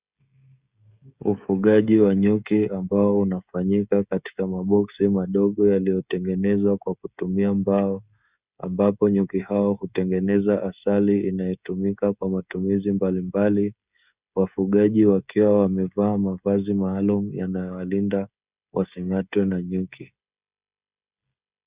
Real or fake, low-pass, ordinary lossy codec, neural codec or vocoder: fake; 3.6 kHz; Opus, 64 kbps; codec, 16 kHz, 16 kbps, FreqCodec, smaller model